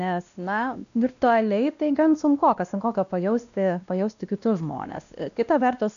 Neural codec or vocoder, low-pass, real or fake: codec, 16 kHz, 1 kbps, X-Codec, WavLM features, trained on Multilingual LibriSpeech; 7.2 kHz; fake